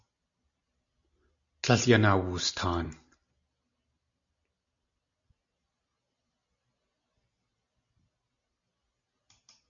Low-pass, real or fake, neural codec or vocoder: 7.2 kHz; real; none